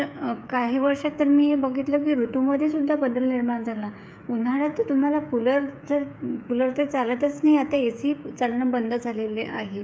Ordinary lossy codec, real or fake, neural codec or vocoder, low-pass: none; fake; codec, 16 kHz, 8 kbps, FreqCodec, smaller model; none